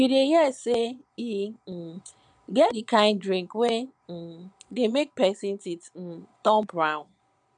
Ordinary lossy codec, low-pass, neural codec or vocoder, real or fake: none; 9.9 kHz; vocoder, 22.05 kHz, 80 mel bands, Vocos; fake